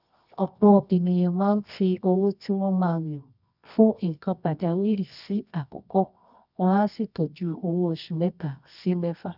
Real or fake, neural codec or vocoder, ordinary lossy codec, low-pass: fake; codec, 24 kHz, 0.9 kbps, WavTokenizer, medium music audio release; none; 5.4 kHz